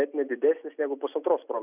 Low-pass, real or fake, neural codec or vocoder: 3.6 kHz; real; none